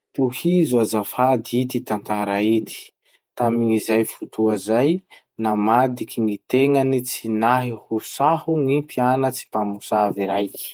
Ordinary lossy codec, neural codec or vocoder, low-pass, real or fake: Opus, 32 kbps; vocoder, 48 kHz, 128 mel bands, Vocos; 19.8 kHz; fake